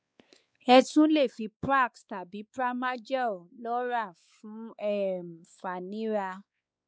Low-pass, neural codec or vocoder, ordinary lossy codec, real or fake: none; codec, 16 kHz, 4 kbps, X-Codec, WavLM features, trained on Multilingual LibriSpeech; none; fake